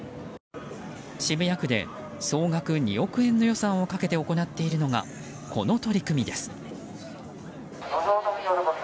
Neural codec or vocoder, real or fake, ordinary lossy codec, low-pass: none; real; none; none